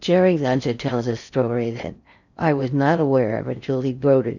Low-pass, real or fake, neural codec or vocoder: 7.2 kHz; fake; codec, 16 kHz in and 24 kHz out, 0.6 kbps, FocalCodec, streaming, 4096 codes